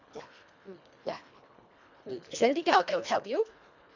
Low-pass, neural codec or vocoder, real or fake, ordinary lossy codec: 7.2 kHz; codec, 24 kHz, 1.5 kbps, HILCodec; fake; MP3, 64 kbps